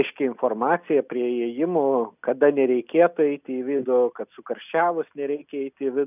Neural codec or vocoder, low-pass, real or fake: none; 3.6 kHz; real